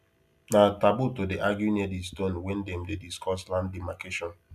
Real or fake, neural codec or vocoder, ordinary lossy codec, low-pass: real; none; none; 14.4 kHz